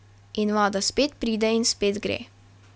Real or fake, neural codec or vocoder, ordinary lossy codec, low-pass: real; none; none; none